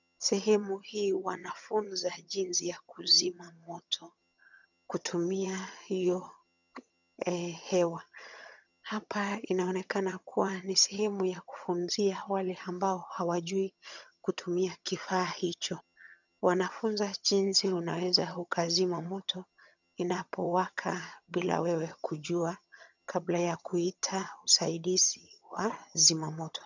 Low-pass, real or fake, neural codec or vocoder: 7.2 kHz; fake; vocoder, 22.05 kHz, 80 mel bands, HiFi-GAN